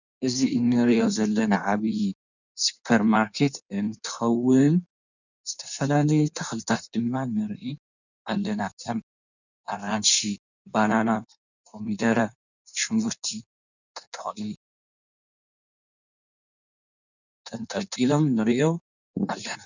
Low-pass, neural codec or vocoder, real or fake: 7.2 kHz; codec, 16 kHz in and 24 kHz out, 1.1 kbps, FireRedTTS-2 codec; fake